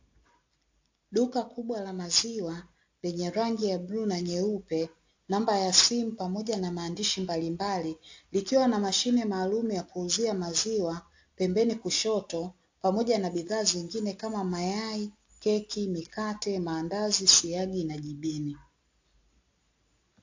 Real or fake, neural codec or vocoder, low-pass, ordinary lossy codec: real; none; 7.2 kHz; AAC, 48 kbps